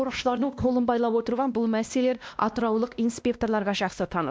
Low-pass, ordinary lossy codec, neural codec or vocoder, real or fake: none; none; codec, 16 kHz, 1 kbps, X-Codec, WavLM features, trained on Multilingual LibriSpeech; fake